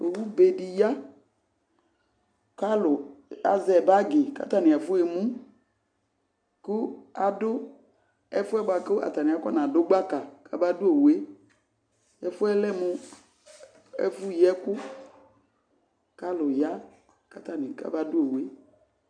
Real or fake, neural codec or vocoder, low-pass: real; none; 9.9 kHz